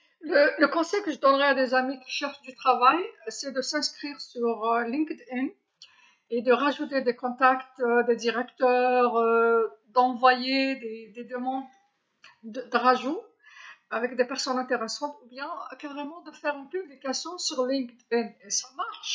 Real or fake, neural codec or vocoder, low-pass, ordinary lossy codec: real; none; none; none